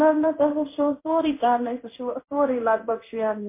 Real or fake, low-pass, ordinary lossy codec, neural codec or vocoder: fake; 3.6 kHz; MP3, 24 kbps; codec, 16 kHz in and 24 kHz out, 1 kbps, XY-Tokenizer